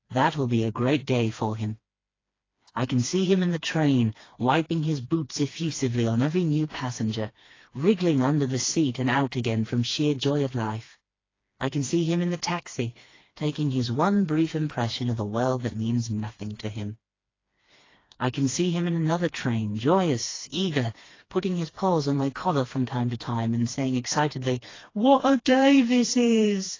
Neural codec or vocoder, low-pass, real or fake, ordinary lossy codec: codec, 16 kHz, 2 kbps, FreqCodec, smaller model; 7.2 kHz; fake; AAC, 32 kbps